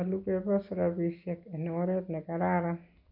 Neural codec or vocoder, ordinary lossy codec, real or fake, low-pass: none; none; real; 5.4 kHz